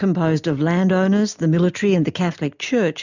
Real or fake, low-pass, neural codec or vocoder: real; 7.2 kHz; none